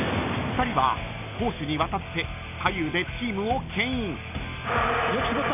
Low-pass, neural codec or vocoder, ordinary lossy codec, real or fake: 3.6 kHz; none; none; real